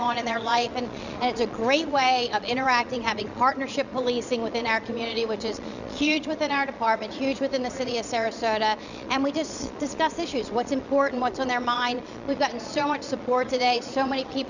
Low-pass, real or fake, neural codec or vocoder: 7.2 kHz; fake; vocoder, 22.05 kHz, 80 mel bands, Vocos